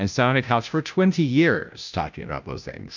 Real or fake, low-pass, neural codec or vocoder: fake; 7.2 kHz; codec, 16 kHz, 0.5 kbps, FunCodec, trained on Chinese and English, 25 frames a second